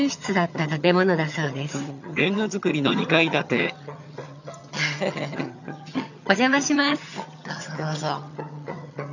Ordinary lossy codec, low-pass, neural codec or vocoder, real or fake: none; 7.2 kHz; vocoder, 22.05 kHz, 80 mel bands, HiFi-GAN; fake